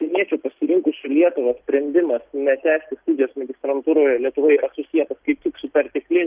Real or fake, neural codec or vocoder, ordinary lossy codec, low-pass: real; none; Opus, 24 kbps; 3.6 kHz